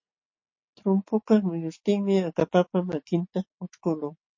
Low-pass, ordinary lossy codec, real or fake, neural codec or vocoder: 7.2 kHz; MP3, 32 kbps; real; none